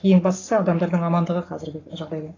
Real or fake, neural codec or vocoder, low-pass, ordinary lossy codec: fake; codec, 44.1 kHz, 7.8 kbps, Pupu-Codec; 7.2 kHz; AAC, 32 kbps